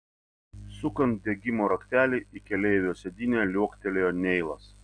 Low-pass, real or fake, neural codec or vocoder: 9.9 kHz; real; none